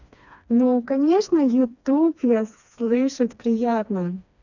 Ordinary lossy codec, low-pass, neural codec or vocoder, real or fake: none; 7.2 kHz; codec, 16 kHz, 2 kbps, FreqCodec, smaller model; fake